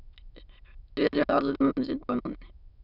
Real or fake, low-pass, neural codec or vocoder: fake; 5.4 kHz; autoencoder, 22.05 kHz, a latent of 192 numbers a frame, VITS, trained on many speakers